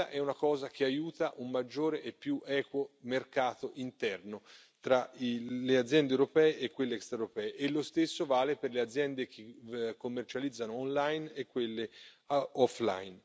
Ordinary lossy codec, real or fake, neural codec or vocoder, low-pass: none; real; none; none